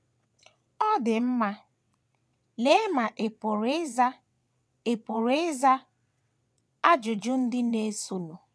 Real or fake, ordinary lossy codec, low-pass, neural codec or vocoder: fake; none; none; vocoder, 22.05 kHz, 80 mel bands, WaveNeXt